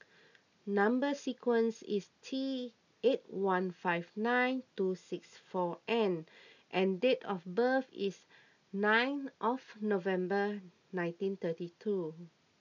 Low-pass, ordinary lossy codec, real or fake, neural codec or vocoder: 7.2 kHz; none; real; none